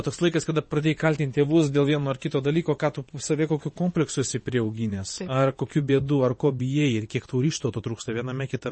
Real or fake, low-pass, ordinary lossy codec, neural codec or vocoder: fake; 9.9 kHz; MP3, 32 kbps; vocoder, 22.05 kHz, 80 mel bands, WaveNeXt